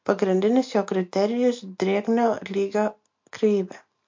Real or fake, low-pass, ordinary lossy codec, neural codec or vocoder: real; 7.2 kHz; MP3, 48 kbps; none